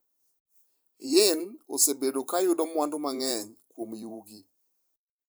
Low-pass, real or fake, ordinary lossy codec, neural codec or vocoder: none; fake; none; vocoder, 44.1 kHz, 128 mel bands every 512 samples, BigVGAN v2